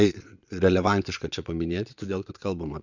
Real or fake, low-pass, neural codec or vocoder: fake; 7.2 kHz; vocoder, 22.05 kHz, 80 mel bands, Vocos